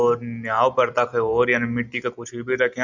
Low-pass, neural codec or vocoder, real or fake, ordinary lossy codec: 7.2 kHz; none; real; none